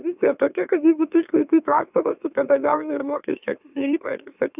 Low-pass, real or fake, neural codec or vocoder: 3.6 kHz; fake; autoencoder, 44.1 kHz, a latent of 192 numbers a frame, MeloTTS